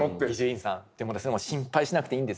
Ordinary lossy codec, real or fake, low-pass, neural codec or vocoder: none; real; none; none